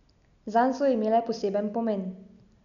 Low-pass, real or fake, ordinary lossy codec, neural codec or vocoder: 7.2 kHz; real; none; none